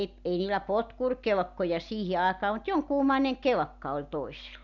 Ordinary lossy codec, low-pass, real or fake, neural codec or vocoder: none; 7.2 kHz; real; none